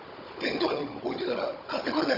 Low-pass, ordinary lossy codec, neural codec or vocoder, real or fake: 5.4 kHz; Opus, 64 kbps; codec, 16 kHz, 16 kbps, FunCodec, trained on Chinese and English, 50 frames a second; fake